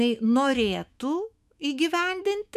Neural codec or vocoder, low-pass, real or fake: autoencoder, 48 kHz, 128 numbers a frame, DAC-VAE, trained on Japanese speech; 14.4 kHz; fake